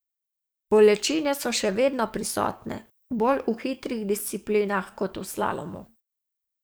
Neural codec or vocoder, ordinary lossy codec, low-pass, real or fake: codec, 44.1 kHz, 7.8 kbps, DAC; none; none; fake